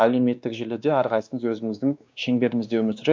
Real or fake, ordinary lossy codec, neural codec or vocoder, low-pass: fake; none; codec, 16 kHz, 2 kbps, X-Codec, WavLM features, trained on Multilingual LibriSpeech; none